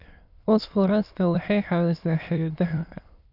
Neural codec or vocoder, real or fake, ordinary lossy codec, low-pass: autoencoder, 22.05 kHz, a latent of 192 numbers a frame, VITS, trained on many speakers; fake; none; 5.4 kHz